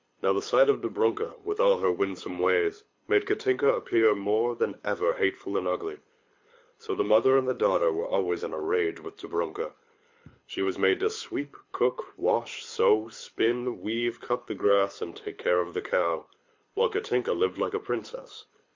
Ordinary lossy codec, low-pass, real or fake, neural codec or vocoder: MP3, 48 kbps; 7.2 kHz; fake; codec, 24 kHz, 6 kbps, HILCodec